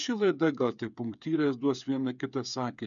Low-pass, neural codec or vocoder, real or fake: 7.2 kHz; codec, 16 kHz, 8 kbps, FreqCodec, smaller model; fake